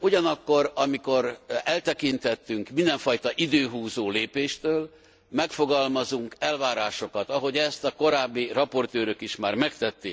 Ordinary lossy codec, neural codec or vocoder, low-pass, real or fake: none; none; none; real